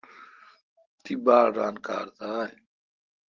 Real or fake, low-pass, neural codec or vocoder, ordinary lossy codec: real; 7.2 kHz; none; Opus, 16 kbps